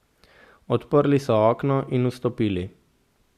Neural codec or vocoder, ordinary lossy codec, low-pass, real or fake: none; Opus, 64 kbps; 14.4 kHz; real